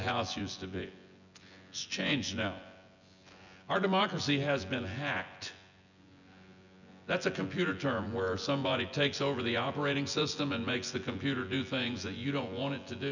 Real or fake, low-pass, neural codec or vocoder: fake; 7.2 kHz; vocoder, 24 kHz, 100 mel bands, Vocos